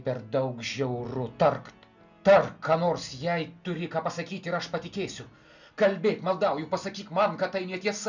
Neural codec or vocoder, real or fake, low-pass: none; real; 7.2 kHz